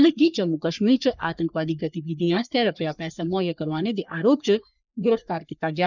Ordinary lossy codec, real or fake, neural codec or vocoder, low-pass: none; fake; codec, 44.1 kHz, 3.4 kbps, Pupu-Codec; 7.2 kHz